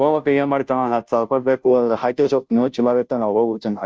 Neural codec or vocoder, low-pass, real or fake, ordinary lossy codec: codec, 16 kHz, 0.5 kbps, FunCodec, trained on Chinese and English, 25 frames a second; none; fake; none